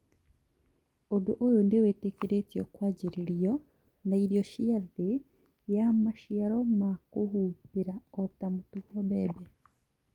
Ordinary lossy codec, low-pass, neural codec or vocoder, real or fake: Opus, 32 kbps; 19.8 kHz; none; real